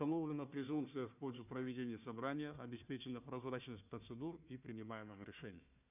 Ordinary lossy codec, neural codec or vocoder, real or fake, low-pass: none; codec, 16 kHz, 1 kbps, FunCodec, trained on Chinese and English, 50 frames a second; fake; 3.6 kHz